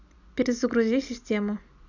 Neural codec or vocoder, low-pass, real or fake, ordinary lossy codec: none; 7.2 kHz; real; none